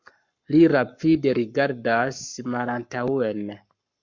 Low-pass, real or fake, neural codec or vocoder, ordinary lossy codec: 7.2 kHz; fake; codec, 44.1 kHz, 7.8 kbps, Pupu-Codec; MP3, 64 kbps